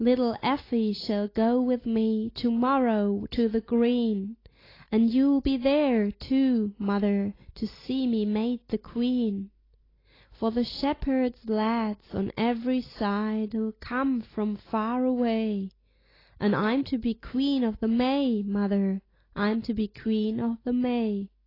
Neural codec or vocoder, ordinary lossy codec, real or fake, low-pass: none; AAC, 24 kbps; real; 5.4 kHz